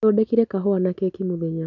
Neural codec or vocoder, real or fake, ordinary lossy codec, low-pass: none; real; none; 7.2 kHz